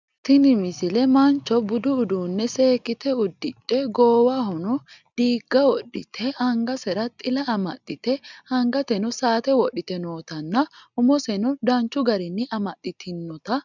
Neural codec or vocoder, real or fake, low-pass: none; real; 7.2 kHz